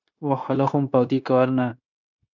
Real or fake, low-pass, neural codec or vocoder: fake; 7.2 kHz; codec, 16 kHz, 0.9 kbps, LongCat-Audio-Codec